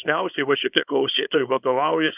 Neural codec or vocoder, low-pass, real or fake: codec, 24 kHz, 0.9 kbps, WavTokenizer, small release; 3.6 kHz; fake